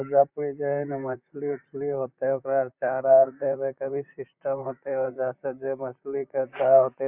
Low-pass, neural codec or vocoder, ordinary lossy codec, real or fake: 3.6 kHz; vocoder, 44.1 kHz, 128 mel bands, Pupu-Vocoder; none; fake